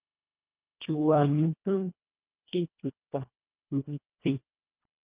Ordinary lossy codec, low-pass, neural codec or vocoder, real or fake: Opus, 24 kbps; 3.6 kHz; codec, 24 kHz, 1.5 kbps, HILCodec; fake